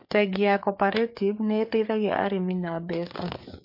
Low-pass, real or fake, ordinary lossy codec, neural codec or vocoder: 5.4 kHz; fake; MP3, 32 kbps; codec, 16 kHz, 4 kbps, FreqCodec, larger model